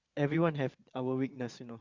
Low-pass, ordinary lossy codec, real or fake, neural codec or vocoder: 7.2 kHz; Opus, 64 kbps; fake; vocoder, 22.05 kHz, 80 mel bands, Vocos